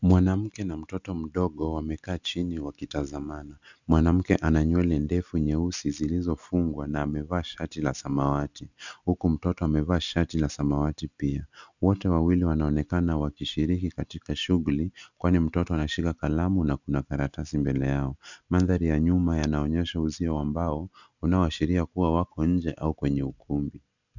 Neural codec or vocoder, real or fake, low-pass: none; real; 7.2 kHz